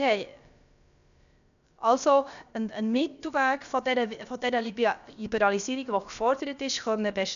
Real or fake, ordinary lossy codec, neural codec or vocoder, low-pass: fake; none; codec, 16 kHz, about 1 kbps, DyCAST, with the encoder's durations; 7.2 kHz